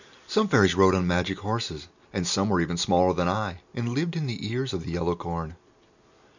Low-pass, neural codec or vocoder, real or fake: 7.2 kHz; none; real